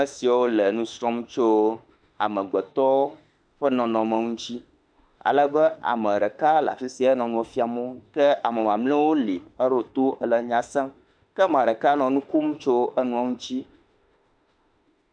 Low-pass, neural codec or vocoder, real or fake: 9.9 kHz; autoencoder, 48 kHz, 32 numbers a frame, DAC-VAE, trained on Japanese speech; fake